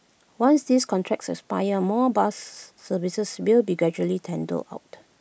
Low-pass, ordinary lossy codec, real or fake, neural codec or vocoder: none; none; real; none